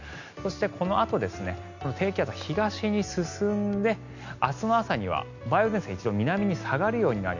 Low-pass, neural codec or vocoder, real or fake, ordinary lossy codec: 7.2 kHz; none; real; none